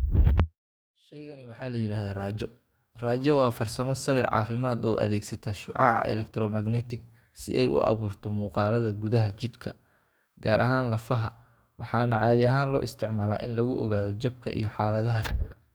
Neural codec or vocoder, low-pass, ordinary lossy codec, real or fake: codec, 44.1 kHz, 2.6 kbps, DAC; none; none; fake